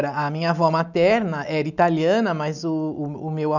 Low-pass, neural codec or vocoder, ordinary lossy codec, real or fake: 7.2 kHz; none; none; real